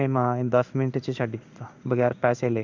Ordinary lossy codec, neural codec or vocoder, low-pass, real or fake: none; codec, 16 kHz in and 24 kHz out, 1 kbps, XY-Tokenizer; 7.2 kHz; fake